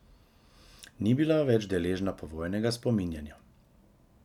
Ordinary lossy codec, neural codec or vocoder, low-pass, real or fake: none; none; 19.8 kHz; real